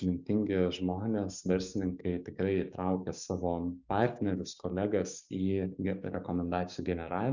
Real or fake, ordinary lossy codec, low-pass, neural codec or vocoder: fake; Opus, 64 kbps; 7.2 kHz; codec, 16 kHz, 6 kbps, DAC